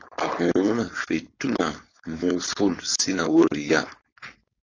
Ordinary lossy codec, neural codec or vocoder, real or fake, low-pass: AAC, 32 kbps; codec, 16 kHz, 4.8 kbps, FACodec; fake; 7.2 kHz